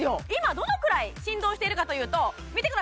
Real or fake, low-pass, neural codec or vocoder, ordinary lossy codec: real; none; none; none